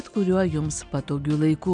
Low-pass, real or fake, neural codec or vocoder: 9.9 kHz; real; none